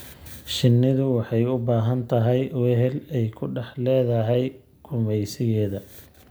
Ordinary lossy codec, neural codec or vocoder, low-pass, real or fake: none; none; none; real